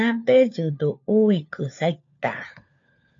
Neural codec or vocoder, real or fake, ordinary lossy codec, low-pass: codec, 16 kHz, 4 kbps, FunCodec, trained on LibriTTS, 50 frames a second; fake; AAC, 48 kbps; 7.2 kHz